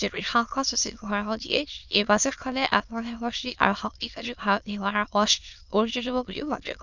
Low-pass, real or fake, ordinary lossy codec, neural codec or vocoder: 7.2 kHz; fake; none; autoencoder, 22.05 kHz, a latent of 192 numbers a frame, VITS, trained on many speakers